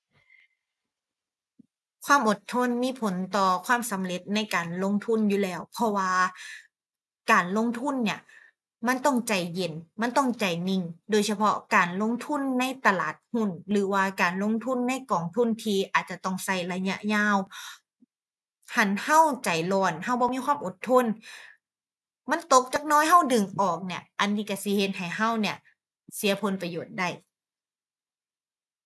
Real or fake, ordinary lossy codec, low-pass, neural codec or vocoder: real; none; none; none